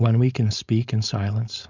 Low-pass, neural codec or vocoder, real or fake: 7.2 kHz; codec, 16 kHz, 4.8 kbps, FACodec; fake